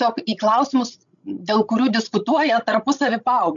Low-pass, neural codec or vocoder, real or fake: 7.2 kHz; codec, 16 kHz, 16 kbps, FreqCodec, larger model; fake